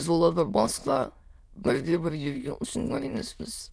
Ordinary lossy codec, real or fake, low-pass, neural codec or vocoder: none; fake; none; autoencoder, 22.05 kHz, a latent of 192 numbers a frame, VITS, trained on many speakers